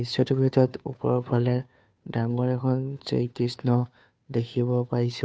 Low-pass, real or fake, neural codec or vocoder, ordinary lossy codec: none; fake; codec, 16 kHz, 2 kbps, FunCodec, trained on Chinese and English, 25 frames a second; none